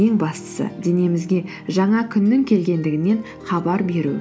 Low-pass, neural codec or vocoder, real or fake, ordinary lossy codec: none; none; real; none